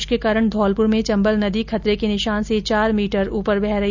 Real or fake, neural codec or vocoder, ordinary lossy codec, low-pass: real; none; none; 7.2 kHz